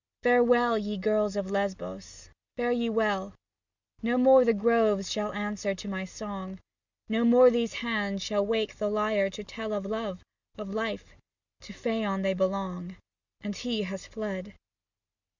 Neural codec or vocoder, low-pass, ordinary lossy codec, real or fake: none; 7.2 kHz; Opus, 64 kbps; real